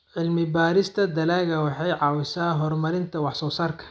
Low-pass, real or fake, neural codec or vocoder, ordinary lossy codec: none; real; none; none